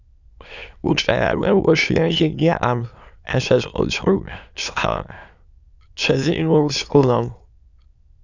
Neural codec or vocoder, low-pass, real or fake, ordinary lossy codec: autoencoder, 22.05 kHz, a latent of 192 numbers a frame, VITS, trained on many speakers; 7.2 kHz; fake; Opus, 64 kbps